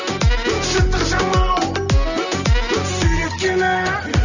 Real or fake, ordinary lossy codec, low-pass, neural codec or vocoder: real; none; 7.2 kHz; none